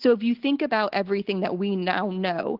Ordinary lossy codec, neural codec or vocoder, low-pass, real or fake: Opus, 16 kbps; none; 5.4 kHz; real